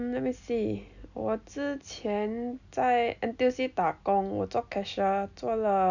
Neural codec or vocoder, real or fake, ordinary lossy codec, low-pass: none; real; none; 7.2 kHz